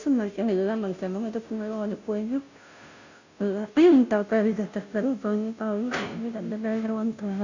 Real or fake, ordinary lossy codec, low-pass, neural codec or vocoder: fake; none; 7.2 kHz; codec, 16 kHz, 0.5 kbps, FunCodec, trained on Chinese and English, 25 frames a second